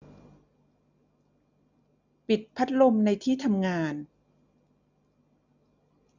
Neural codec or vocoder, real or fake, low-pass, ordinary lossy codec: none; real; 7.2 kHz; none